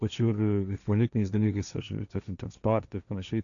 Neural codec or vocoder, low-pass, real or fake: codec, 16 kHz, 1.1 kbps, Voila-Tokenizer; 7.2 kHz; fake